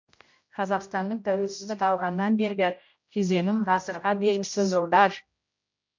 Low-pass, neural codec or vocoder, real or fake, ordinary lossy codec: 7.2 kHz; codec, 16 kHz, 0.5 kbps, X-Codec, HuBERT features, trained on general audio; fake; MP3, 48 kbps